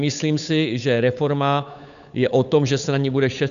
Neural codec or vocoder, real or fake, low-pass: codec, 16 kHz, 8 kbps, FunCodec, trained on Chinese and English, 25 frames a second; fake; 7.2 kHz